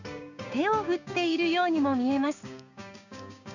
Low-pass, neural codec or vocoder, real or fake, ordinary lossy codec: 7.2 kHz; codec, 16 kHz, 6 kbps, DAC; fake; none